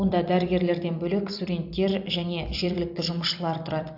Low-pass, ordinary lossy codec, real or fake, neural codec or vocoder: 5.4 kHz; AAC, 48 kbps; fake; vocoder, 44.1 kHz, 128 mel bands every 256 samples, BigVGAN v2